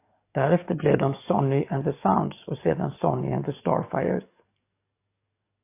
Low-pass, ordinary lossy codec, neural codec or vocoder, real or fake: 3.6 kHz; AAC, 24 kbps; codec, 44.1 kHz, 7.8 kbps, DAC; fake